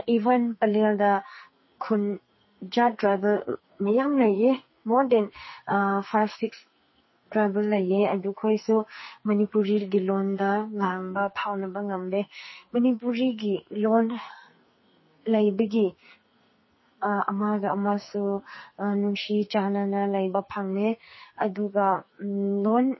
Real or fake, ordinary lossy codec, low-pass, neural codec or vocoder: fake; MP3, 24 kbps; 7.2 kHz; codec, 44.1 kHz, 2.6 kbps, SNAC